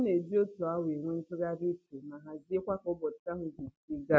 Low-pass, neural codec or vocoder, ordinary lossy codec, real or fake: none; none; none; real